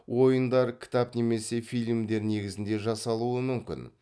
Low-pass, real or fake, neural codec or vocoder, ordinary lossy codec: none; real; none; none